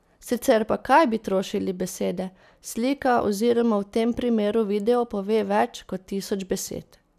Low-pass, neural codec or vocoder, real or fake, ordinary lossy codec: 14.4 kHz; none; real; none